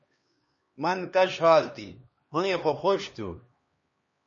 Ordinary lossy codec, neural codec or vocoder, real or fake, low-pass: MP3, 32 kbps; codec, 16 kHz, 2 kbps, X-Codec, HuBERT features, trained on LibriSpeech; fake; 7.2 kHz